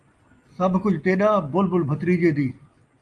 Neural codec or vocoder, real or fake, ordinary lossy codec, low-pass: none; real; Opus, 32 kbps; 10.8 kHz